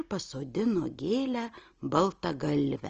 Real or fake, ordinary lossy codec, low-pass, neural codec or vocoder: real; Opus, 24 kbps; 7.2 kHz; none